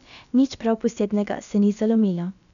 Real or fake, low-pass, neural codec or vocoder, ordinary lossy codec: fake; 7.2 kHz; codec, 16 kHz, about 1 kbps, DyCAST, with the encoder's durations; none